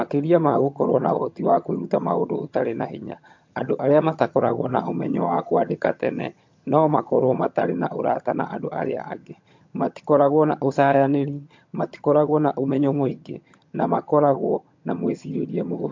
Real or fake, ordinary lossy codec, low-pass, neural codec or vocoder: fake; MP3, 48 kbps; 7.2 kHz; vocoder, 22.05 kHz, 80 mel bands, HiFi-GAN